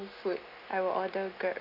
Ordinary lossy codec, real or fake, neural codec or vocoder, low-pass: none; real; none; 5.4 kHz